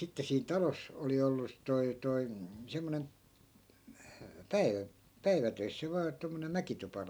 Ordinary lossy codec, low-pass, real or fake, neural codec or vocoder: none; none; real; none